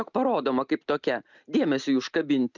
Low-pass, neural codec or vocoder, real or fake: 7.2 kHz; none; real